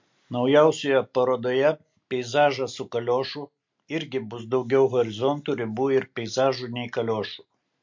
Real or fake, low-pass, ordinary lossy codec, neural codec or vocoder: real; 7.2 kHz; MP3, 48 kbps; none